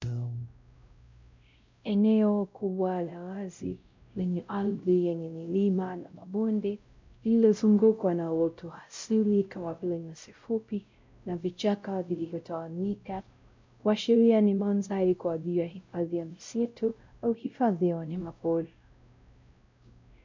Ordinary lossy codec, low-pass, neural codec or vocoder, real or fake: MP3, 64 kbps; 7.2 kHz; codec, 16 kHz, 0.5 kbps, X-Codec, WavLM features, trained on Multilingual LibriSpeech; fake